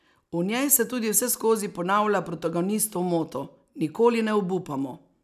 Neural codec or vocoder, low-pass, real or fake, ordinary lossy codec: none; 14.4 kHz; real; none